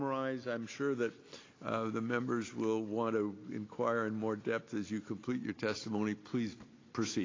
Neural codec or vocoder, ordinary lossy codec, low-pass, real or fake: none; AAC, 32 kbps; 7.2 kHz; real